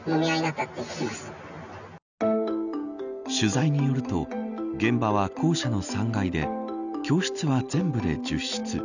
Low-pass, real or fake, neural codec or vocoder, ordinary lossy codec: 7.2 kHz; real; none; none